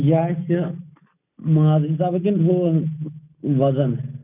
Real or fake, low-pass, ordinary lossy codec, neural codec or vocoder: real; 3.6 kHz; none; none